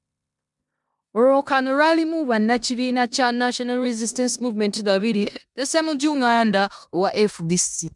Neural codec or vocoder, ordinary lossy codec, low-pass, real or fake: codec, 16 kHz in and 24 kHz out, 0.9 kbps, LongCat-Audio-Codec, four codebook decoder; MP3, 96 kbps; 10.8 kHz; fake